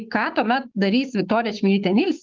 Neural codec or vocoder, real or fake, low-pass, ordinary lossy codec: codec, 16 kHz, 6 kbps, DAC; fake; 7.2 kHz; Opus, 32 kbps